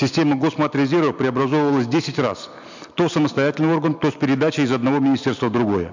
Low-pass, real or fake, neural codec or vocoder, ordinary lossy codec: 7.2 kHz; real; none; MP3, 64 kbps